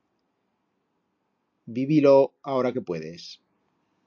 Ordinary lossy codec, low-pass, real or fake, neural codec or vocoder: MP3, 48 kbps; 7.2 kHz; real; none